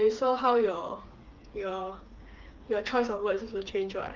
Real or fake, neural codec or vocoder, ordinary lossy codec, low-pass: fake; codec, 16 kHz, 4 kbps, FreqCodec, smaller model; Opus, 32 kbps; 7.2 kHz